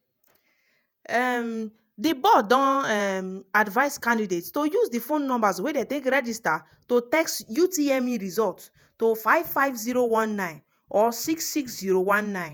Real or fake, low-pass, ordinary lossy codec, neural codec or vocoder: fake; none; none; vocoder, 48 kHz, 128 mel bands, Vocos